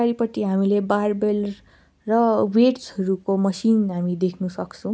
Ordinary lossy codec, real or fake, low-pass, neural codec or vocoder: none; real; none; none